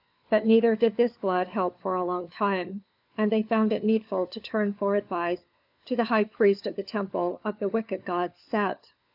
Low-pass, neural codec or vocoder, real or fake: 5.4 kHz; codec, 16 kHz, 4 kbps, FunCodec, trained on LibriTTS, 50 frames a second; fake